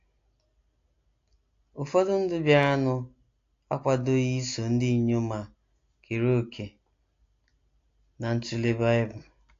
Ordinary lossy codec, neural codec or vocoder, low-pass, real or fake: AAC, 48 kbps; none; 7.2 kHz; real